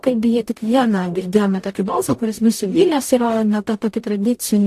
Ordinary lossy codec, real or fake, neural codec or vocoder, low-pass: AAC, 64 kbps; fake; codec, 44.1 kHz, 0.9 kbps, DAC; 14.4 kHz